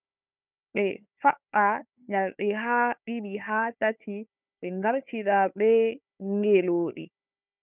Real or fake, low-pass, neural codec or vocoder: fake; 3.6 kHz; codec, 16 kHz, 4 kbps, FunCodec, trained on Chinese and English, 50 frames a second